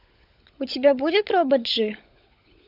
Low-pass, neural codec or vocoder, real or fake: 5.4 kHz; codec, 16 kHz, 16 kbps, FunCodec, trained on LibriTTS, 50 frames a second; fake